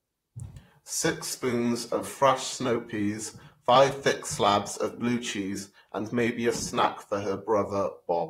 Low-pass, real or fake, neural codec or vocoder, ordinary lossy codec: 19.8 kHz; fake; vocoder, 44.1 kHz, 128 mel bands, Pupu-Vocoder; AAC, 48 kbps